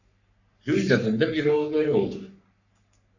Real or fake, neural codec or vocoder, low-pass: fake; codec, 44.1 kHz, 3.4 kbps, Pupu-Codec; 7.2 kHz